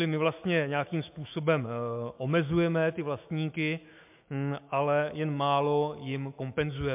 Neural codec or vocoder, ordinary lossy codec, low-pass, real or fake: autoencoder, 48 kHz, 128 numbers a frame, DAC-VAE, trained on Japanese speech; MP3, 32 kbps; 3.6 kHz; fake